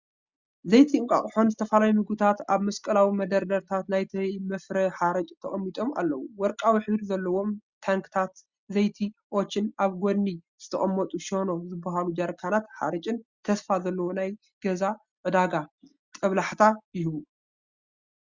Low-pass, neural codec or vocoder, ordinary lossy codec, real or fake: 7.2 kHz; none; Opus, 64 kbps; real